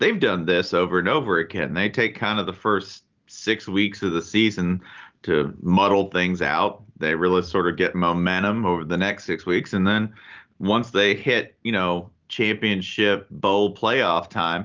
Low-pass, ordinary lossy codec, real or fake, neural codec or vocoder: 7.2 kHz; Opus, 24 kbps; real; none